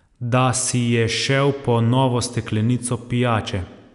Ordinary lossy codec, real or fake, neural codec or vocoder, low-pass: none; real; none; 10.8 kHz